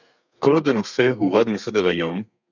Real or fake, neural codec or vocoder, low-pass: fake; codec, 32 kHz, 1.9 kbps, SNAC; 7.2 kHz